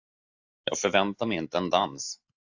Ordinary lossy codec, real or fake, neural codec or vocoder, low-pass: AAC, 48 kbps; real; none; 7.2 kHz